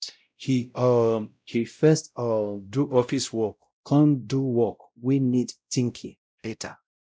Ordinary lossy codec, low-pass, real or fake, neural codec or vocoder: none; none; fake; codec, 16 kHz, 0.5 kbps, X-Codec, WavLM features, trained on Multilingual LibriSpeech